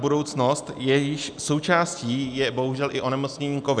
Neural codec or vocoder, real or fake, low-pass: none; real; 9.9 kHz